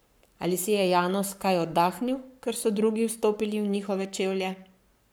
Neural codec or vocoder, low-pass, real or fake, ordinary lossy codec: codec, 44.1 kHz, 7.8 kbps, Pupu-Codec; none; fake; none